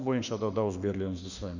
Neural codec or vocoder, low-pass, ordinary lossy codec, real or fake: autoencoder, 48 kHz, 32 numbers a frame, DAC-VAE, trained on Japanese speech; 7.2 kHz; none; fake